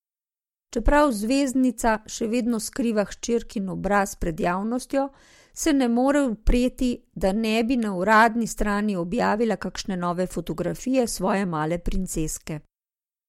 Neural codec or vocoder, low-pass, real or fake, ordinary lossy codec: none; 19.8 kHz; real; MP3, 64 kbps